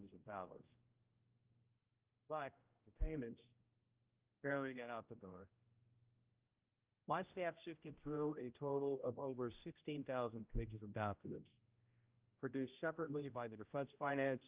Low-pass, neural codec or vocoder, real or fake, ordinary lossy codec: 3.6 kHz; codec, 16 kHz, 0.5 kbps, X-Codec, HuBERT features, trained on general audio; fake; Opus, 24 kbps